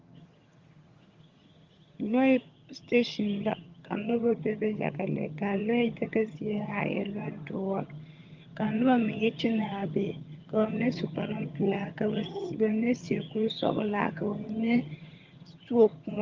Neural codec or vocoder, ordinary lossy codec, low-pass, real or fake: vocoder, 22.05 kHz, 80 mel bands, HiFi-GAN; Opus, 32 kbps; 7.2 kHz; fake